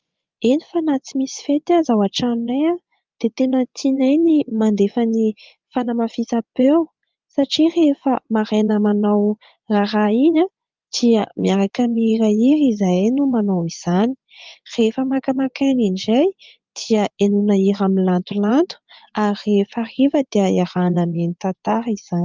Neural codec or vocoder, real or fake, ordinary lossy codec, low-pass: vocoder, 24 kHz, 100 mel bands, Vocos; fake; Opus, 24 kbps; 7.2 kHz